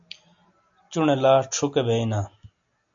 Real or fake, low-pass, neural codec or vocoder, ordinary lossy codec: real; 7.2 kHz; none; AAC, 64 kbps